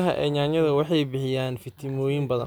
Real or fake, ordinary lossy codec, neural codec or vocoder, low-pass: real; none; none; none